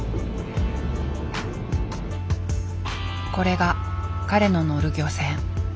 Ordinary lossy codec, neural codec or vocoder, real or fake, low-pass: none; none; real; none